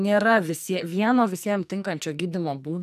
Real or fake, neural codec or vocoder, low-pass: fake; codec, 44.1 kHz, 2.6 kbps, SNAC; 14.4 kHz